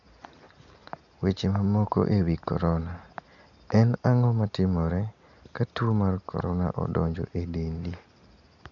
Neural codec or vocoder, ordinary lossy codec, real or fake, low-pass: none; none; real; 7.2 kHz